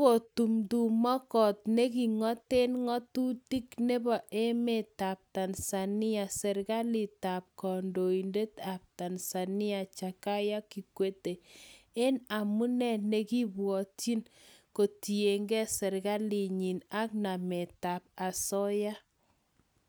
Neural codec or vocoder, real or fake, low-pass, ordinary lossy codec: none; real; none; none